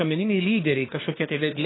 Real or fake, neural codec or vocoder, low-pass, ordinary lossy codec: fake; codec, 24 kHz, 1 kbps, SNAC; 7.2 kHz; AAC, 16 kbps